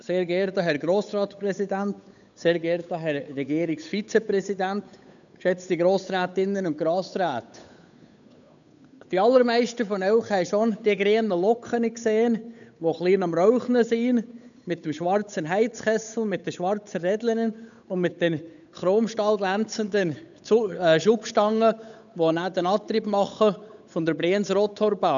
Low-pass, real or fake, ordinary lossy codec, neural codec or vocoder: 7.2 kHz; fake; none; codec, 16 kHz, 8 kbps, FunCodec, trained on Chinese and English, 25 frames a second